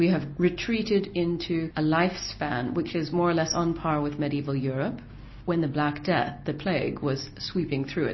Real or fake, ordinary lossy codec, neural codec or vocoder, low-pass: real; MP3, 24 kbps; none; 7.2 kHz